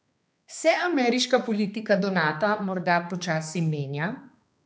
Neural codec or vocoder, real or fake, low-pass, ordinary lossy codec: codec, 16 kHz, 2 kbps, X-Codec, HuBERT features, trained on balanced general audio; fake; none; none